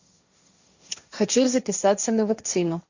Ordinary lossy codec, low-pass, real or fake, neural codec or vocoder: Opus, 64 kbps; 7.2 kHz; fake; codec, 16 kHz, 1.1 kbps, Voila-Tokenizer